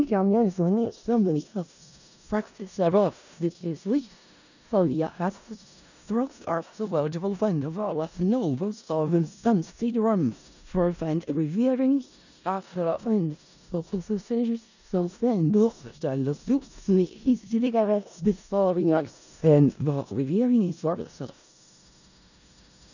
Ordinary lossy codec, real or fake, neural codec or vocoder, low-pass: none; fake; codec, 16 kHz in and 24 kHz out, 0.4 kbps, LongCat-Audio-Codec, four codebook decoder; 7.2 kHz